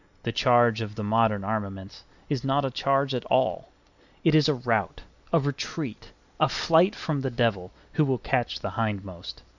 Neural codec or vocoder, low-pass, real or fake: none; 7.2 kHz; real